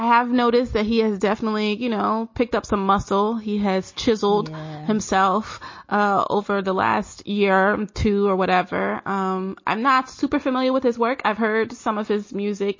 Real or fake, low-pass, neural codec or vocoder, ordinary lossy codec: real; 7.2 kHz; none; MP3, 32 kbps